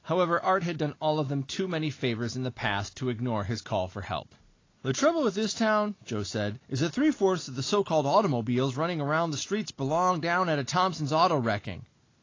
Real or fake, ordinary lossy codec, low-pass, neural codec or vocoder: real; AAC, 32 kbps; 7.2 kHz; none